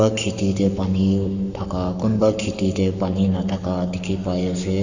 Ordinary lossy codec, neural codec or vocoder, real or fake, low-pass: none; codec, 44.1 kHz, 7.8 kbps, Pupu-Codec; fake; 7.2 kHz